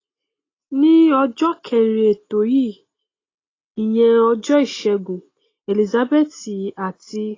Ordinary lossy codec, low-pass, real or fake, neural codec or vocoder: AAC, 32 kbps; 7.2 kHz; real; none